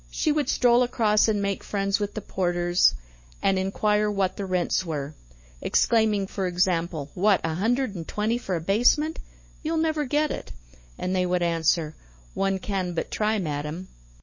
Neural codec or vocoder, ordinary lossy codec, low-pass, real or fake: autoencoder, 48 kHz, 128 numbers a frame, DAC-VAE, trained on Japanese speech; MP3, 32 kbps; 7.2 kHz; fake